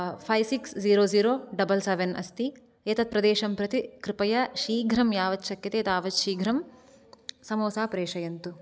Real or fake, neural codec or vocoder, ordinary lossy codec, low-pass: real; none; none; none